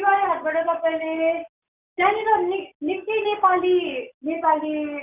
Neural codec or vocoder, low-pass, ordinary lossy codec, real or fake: none; 3.6 kHz; none; real